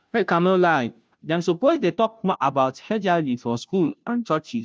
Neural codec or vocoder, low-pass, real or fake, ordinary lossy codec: codec, 16 kHz, 0.5 kbps, FunCodec, trained on Chinese and English, 25 frames a second; none; fake; none